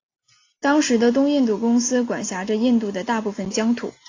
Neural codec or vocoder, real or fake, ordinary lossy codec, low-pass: none; real; AAC, 32 kbps; 7.2 kHz